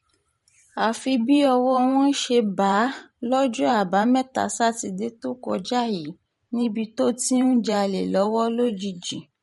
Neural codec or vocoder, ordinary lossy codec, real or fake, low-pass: vocoder, 44.1 kHz, 128 mel bands every 512 samples, BigVGAN v2; MP3, 48 kbps; fake; 19.8 kHz